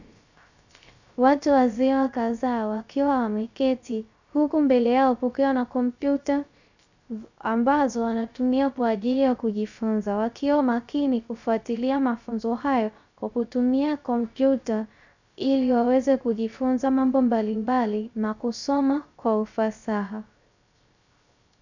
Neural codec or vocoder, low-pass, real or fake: codec, 16 kHz, 0.3 kbps, FocalCodec; 7.2 kHz; fake